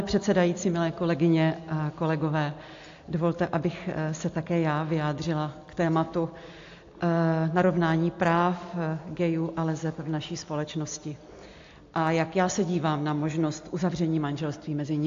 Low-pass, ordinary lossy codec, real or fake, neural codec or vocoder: 7.2 kHz; AAC, 48 kbps; real; none